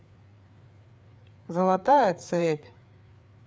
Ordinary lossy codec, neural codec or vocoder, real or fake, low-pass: none; codec, 16 kHz, 4 kbps, FreqCodec, larger model; fake; none